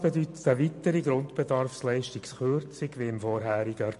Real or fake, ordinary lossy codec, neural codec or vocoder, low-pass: real; MP3, 48 kbps; none; 14.4 kHz